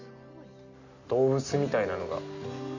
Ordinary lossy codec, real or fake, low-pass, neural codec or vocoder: none; real; 7.2 kHz; none